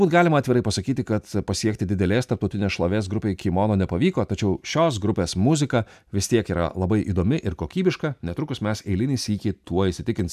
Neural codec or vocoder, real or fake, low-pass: none; real; 14.4 kHz